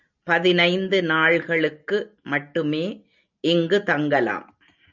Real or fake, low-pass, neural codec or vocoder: real; 7.2 kHz; none